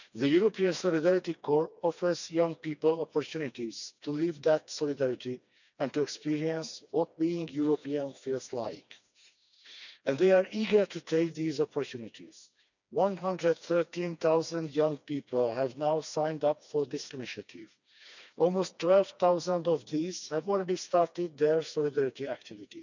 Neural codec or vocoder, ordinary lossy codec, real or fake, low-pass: codec, 16 kHz, 2 kbps, FreqCodec, smaller model; none; fake; 7.2 kHz